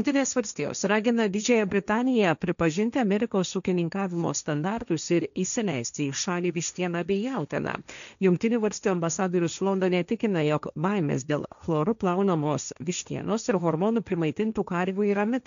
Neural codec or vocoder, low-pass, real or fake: codec, 16 kHz, 1.1 kbps, Voila-Tokenizer; 7.2 kHz; fake